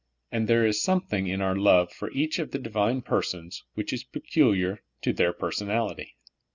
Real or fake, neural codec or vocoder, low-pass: real; none; 7.2 kHz